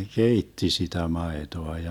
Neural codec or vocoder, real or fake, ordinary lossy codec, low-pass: none; real; none; 19.8 kHz